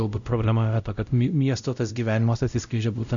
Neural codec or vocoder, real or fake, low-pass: codec, 16 kHz, 0.5 kbps, X-Codec, WavLM features, trained on Multilingual LibriSpeech; fake; 7.2 kHz